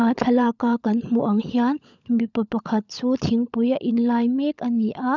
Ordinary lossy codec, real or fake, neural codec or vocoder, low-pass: none; fake; codec, 16 kHz, 16 kbps, FunCodec, trained on LibriTTS, 50 frames a second; 7.2 kHz